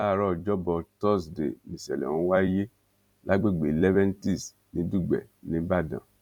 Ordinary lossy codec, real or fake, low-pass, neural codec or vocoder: none; fake; 19.8 kHz; vocoder, 44.1 kHz, 128 mel bands every 256 samples, BigVGAN v2